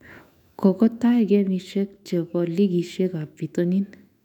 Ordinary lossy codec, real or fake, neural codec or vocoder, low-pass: none; fake; autoencoder, 48 kHz, 128 numbers a frame, DAC-VAE, trained on Japanese speech; 19.8 kHz